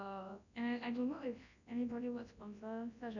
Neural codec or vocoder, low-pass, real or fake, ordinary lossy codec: codec, 24 kHz, 0.9 kbps, WavTokenizer, large speech release; 7.2 kHz; fake; none